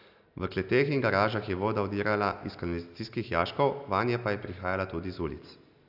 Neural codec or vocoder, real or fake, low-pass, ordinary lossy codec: none; real; 5.4 kHz; AAC, 48 kbps